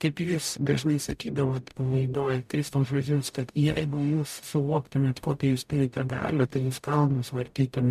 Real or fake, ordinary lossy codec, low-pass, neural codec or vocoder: fake; MP3, 96 kbps; 14.4 kHz; codec, 44.1 kHz, 0.9 kbps, DAC